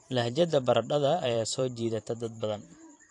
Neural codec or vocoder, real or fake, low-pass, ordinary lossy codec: vocoder, 44.1 kHz, 128 mel bands every 512 samples, BigVGAN v2; fake; 10.8 kHz; AAC, 48 kbps